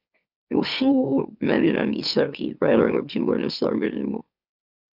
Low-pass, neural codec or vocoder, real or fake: 5.4 kHz; autoencoder, 44.1 kHz, a latent of 192 numbers a frame, MeloTTS; fake